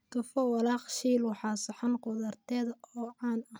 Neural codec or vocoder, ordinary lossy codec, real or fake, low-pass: none; none; real; none